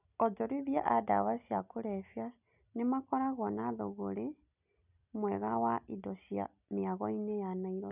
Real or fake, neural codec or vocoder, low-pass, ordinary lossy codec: real; none; 3.6 kHz; none